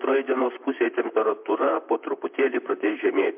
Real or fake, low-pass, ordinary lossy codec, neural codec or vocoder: fake; 3.6 kHz; MP3, 32 kbps; vocoder, 44.1 kHz, 128 mel bands, Pupu-Vocoder